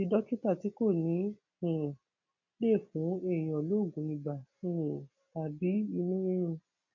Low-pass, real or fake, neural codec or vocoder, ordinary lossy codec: 7.2 kHz; real; none; none